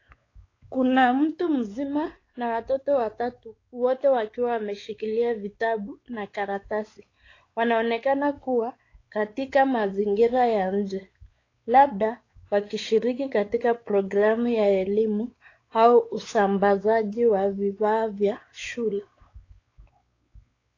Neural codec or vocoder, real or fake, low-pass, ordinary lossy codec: codec, 16 kHz, 4 kbps, X-Codec, WavLM features, trained on Multilingual LibriSpeech; fake; 7.2 kHz; AAC, 32 kbps